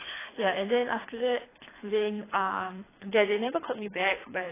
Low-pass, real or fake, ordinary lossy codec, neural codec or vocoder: 3.6 kHz; fake; AAC, 16 kbps; codec, 24 kHz, 3 kbps, HILCodec